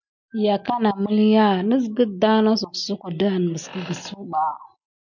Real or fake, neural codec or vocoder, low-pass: real; none; 7.2 kHz